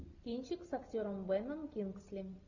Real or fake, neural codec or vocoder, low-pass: real; none; 7.2 kHz